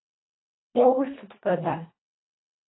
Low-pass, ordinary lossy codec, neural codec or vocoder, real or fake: 7.2 kHz; AAC, 16 kbps; codec, 24 kHz, 1.5 kbps, HILCodec; fake